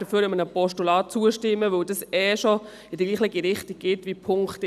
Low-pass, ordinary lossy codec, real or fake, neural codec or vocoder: 14.4 kHz; none; real; none